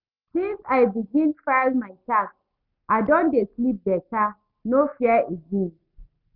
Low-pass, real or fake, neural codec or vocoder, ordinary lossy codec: 5.4 kHz; real; none; none